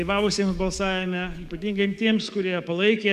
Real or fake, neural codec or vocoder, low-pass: fake; codec, 44.1 kHz, 7.8 kbps, DAC; 14.4 kHz